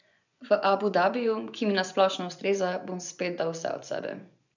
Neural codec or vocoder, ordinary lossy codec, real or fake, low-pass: vocoder, 24 kHz, 100 mel bands, Vocos; none; fake; 7.2 kHz